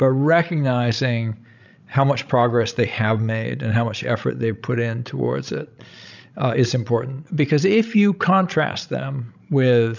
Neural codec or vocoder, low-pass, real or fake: codec, 16 kHz, 16 kbps, FreqCodec, larger model; 7.2 kHz; fake